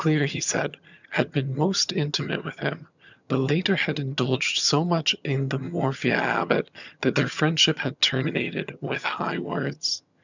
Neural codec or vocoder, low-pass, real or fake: vocoder, 22.05 kHz, 80 mel bands, HiFi-GAN; 7.2 kHz; fake